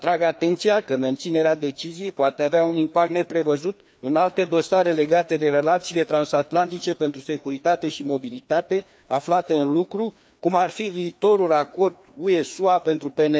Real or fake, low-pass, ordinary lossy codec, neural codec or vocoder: fake; none; none; codec, 16 kHz, 2 kbps, FreqCodec, larger model